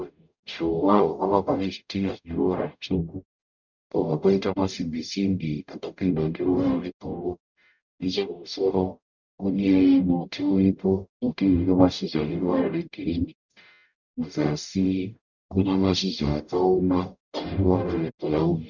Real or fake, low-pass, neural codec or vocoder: fake; 7.2 kHz; codec, 44.1 kHz, 0.9 kbps, DAC